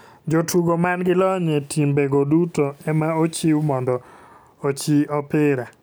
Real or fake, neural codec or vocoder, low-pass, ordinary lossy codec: real; none; none; none